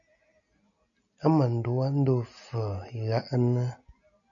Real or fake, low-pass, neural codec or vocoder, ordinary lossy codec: real; 7.2 kHz; none; MP3, 64 kbps